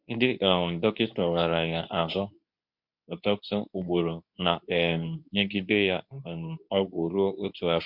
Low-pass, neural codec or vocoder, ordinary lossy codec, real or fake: 5.4 kHz; codec, 24 kHz, 0.9 kbps, WavTokenizer, medium speech release version 2; MP3, 48 kbps; fake